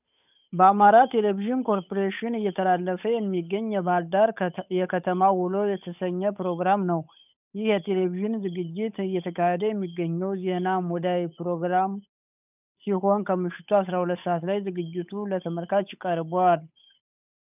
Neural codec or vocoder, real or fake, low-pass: codec, 16 kHz, 8 kbps, FunCodec, trained on Chinese and English, 25 frames a second; fake; 3.6 kHz